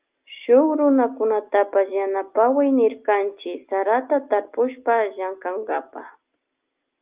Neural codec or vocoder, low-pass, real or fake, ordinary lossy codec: none; 3.6 kHz; real; Opus, 32 kbps